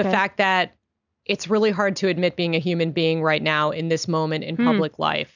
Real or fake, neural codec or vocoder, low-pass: real; none; 7.2 kHz